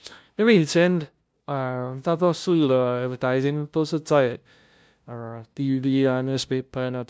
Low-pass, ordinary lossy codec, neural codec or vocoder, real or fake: none; none; codec, 16 kHz, 0.5 kbps, FunCodec, trained on LibriTTS, 25 frames a second; fake